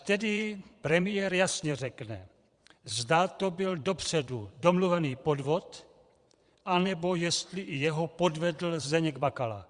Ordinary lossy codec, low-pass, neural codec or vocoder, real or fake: Opus, 64 kbps; 9.9 kHz; vocoder, 22.05 kHz, 80 mel bands, Vocos; fake